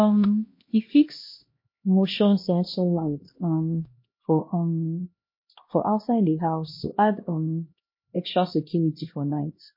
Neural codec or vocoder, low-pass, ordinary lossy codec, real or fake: codec, 16 kHz, 1 kbps, X-Codec, HuBERT features, trained on LibriSpeech; 5.4 kHz; MP3, 32 kbps; fake